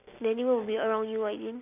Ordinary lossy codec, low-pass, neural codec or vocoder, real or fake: none; 3.6 kHz; none; real